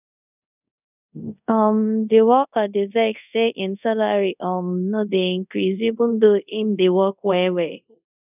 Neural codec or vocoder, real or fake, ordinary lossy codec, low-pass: codec, 24 kHz, 0.5 kbps, DualCodec; fake; none; 3.6 kHz